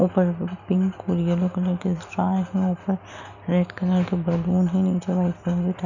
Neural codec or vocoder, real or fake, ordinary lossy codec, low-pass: none; real; none; 7.2 kHz